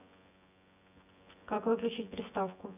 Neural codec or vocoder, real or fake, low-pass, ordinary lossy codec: vocoder, 24 kHz, 100 mel bands, Vocos; fake; 3.6 kHz; none